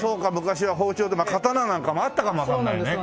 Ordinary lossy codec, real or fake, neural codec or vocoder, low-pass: none; real; none; none